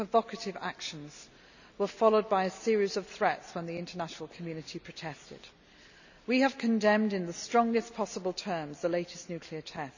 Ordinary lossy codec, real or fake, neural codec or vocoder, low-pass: none; real; none; 7.2 kHz